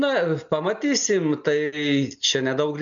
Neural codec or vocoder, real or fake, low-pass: none; real; 7.2 kHz